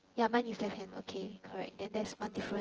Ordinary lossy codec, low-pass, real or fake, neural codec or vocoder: Opus, 16 kbps; 7.2 kHz; fake; vocoder, 24 kHz, 100 mel bands, Vocos